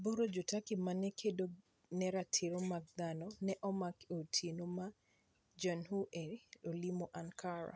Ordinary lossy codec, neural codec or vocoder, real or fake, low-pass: none; none; real; none